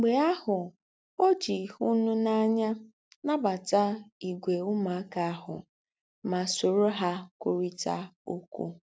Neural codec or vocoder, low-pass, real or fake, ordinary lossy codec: none; none; real; none